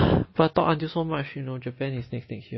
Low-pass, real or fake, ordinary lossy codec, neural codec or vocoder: 7.2 kHz; real; MP3, 24 kbps; none